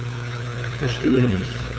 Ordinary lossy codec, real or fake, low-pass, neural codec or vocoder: none; fake; none; codec, 16 kHz, 2 kbps, FunCodec, trained on LibriTTS, 25 frames a second